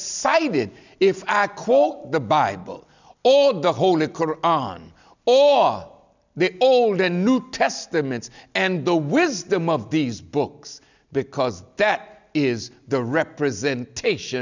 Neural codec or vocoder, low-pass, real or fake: none; 7.2 kHz; real